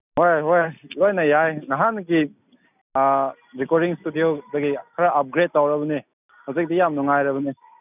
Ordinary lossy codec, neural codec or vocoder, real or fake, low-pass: none; none; real; 3.6 kHz